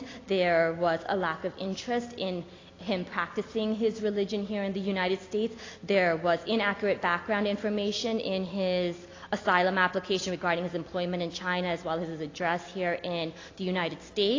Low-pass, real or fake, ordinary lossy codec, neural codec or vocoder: 7.2 kHz; real; AAC, 32 kbps; none